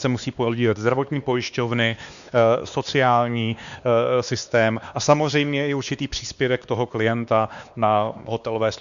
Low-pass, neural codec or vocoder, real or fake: 7.2 kHz; codec, 16 kHz, 2 kbps, X-Codec, WavLM features, trained on Multilingual LibriSpeech; fake